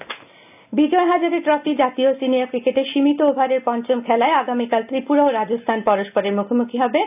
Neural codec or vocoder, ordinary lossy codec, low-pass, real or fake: none; none; 3.6 kHz; real